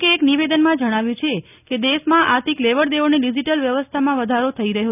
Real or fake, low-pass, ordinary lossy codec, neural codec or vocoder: real; 3.6 kHz; none; none